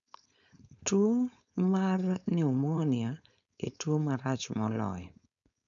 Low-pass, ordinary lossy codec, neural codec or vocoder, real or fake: 7.2 kHz; none; codec, 16 kHz, 4.8 kbps, FACodec; fake